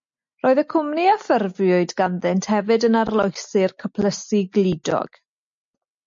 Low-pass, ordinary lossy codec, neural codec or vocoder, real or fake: 7.2 kHz; MP3, 48 kbps; none; real